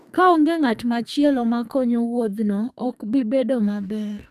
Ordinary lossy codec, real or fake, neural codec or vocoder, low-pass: none; fake; codec, 44.1 kHz, 2.6 kbps, SNAC; 14.4 kHz